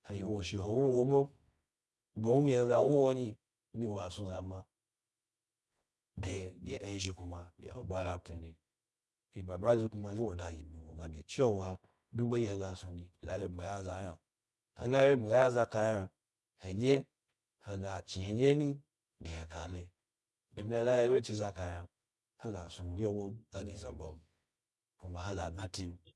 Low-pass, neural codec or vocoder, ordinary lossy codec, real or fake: none; codec, 24 kHz, 0.9 kbps, WavTokenizer, medium music audio release; none; fake